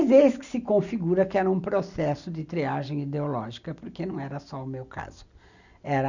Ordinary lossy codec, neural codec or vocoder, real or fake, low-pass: none; none; real; 7.2 kHz